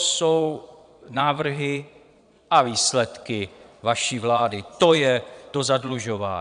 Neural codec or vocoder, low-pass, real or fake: vocoder, 22.05 kHz, 80 mel bands, Vocos; 9.9 kHz; fake